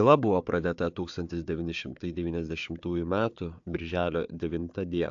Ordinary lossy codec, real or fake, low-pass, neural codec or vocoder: AAC, 64 kbps; fake; 7.2 kHz; codec, 16 kHz, 4 kbps, FunCodec, trained on Chinese and English, 50 frames a second